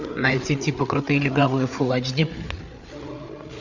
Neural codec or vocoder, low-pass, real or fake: codec, 16 kHz, 8 kbps, FreqCodec, larger model; 7.2 kHz; fake